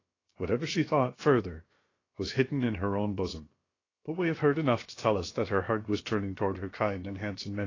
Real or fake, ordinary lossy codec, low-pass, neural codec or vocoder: fake; AAC, 32 kbps; 7.2 kHz; codec, 16 kHz, about 1 kbps, DyCAST, with the encoder's durations